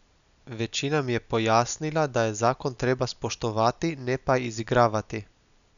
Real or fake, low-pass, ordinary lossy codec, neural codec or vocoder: real; 7.2 kHz; none; none